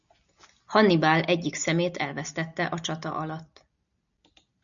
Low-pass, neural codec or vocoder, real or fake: 7.2 kHz; none; real